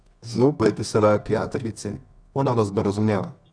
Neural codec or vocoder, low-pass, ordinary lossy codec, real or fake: codec, 24 kHz, 0.9 kbps, WavTokenizer, medium music audio release; 9.9 kHz; none; fake